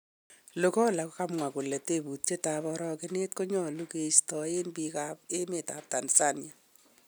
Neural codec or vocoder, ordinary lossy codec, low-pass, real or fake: none; none; none; real